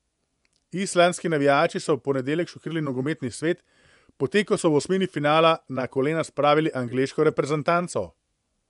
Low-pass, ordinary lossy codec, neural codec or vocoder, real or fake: 10.8 kHz; none; vocoder, 24 kHz, 100 mel bands, Vocos; fake